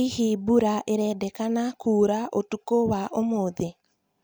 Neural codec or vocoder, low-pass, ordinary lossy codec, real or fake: none; none; none; real